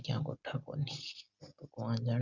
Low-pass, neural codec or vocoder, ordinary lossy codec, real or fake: 7.2 kHz; none; Opus, 64 kbps; real